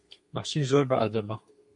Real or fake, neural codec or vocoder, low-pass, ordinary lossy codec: fake; codec, 32 kHz, 1.9 kbps, SNAC; 10.8 kHz; MP3, 48 kbps